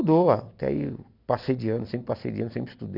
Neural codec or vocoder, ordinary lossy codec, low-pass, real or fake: none; none; 5.4 kHz; real